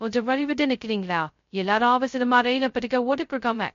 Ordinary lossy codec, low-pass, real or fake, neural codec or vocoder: MP3, 48 kbps; 7.2 kHz; fake; codec, 16 kHz, 0.2 kbps, FocalCodec